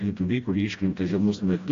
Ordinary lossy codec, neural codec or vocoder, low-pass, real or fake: AAC, 48 kbps; codec, 16 kHz, 1 kbps, FreqCodec, smaller model; 7.2 kHz; fake